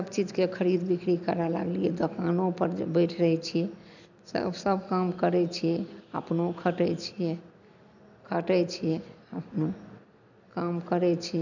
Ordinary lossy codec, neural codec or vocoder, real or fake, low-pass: none; none; real; 7.2 kHz